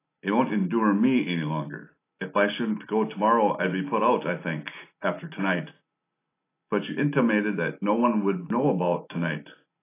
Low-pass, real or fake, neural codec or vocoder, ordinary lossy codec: 3.6 kHz; real; none; AAC, 24 kbps